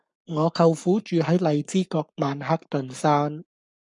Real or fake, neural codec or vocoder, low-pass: fake; codec, 44.1 kHz, 7.8 kbps, Pupu-Codec; 10.8 kHz